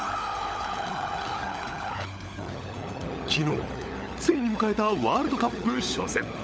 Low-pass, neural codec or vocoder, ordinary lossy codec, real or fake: none; codec, 16 kHz, 16 kbps, FunCodec, trained on LibriTTS, 50 frames a second; none; fake